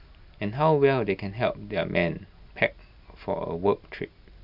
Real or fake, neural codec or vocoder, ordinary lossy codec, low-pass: real; none; none; 5.4 kHz